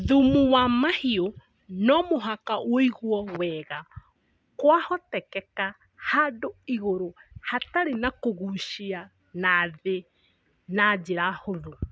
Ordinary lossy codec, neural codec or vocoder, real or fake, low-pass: none; none; real; none